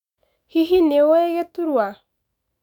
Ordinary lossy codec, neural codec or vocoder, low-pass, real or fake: none; autoencoder, 48 kHz, 128 numbers a frame, DAC-VAE, trained on Japanese speech; 19.8 kHz; fake